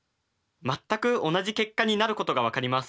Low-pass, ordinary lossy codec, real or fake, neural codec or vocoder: none; none; real; none